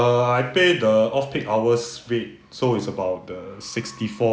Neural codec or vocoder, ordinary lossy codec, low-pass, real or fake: none; none; none; real